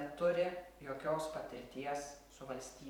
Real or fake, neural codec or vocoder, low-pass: fake; vocoder, 44.1 kHz, 128 mel bands every 512 samples, BigVGAN v2; 19.8 kHz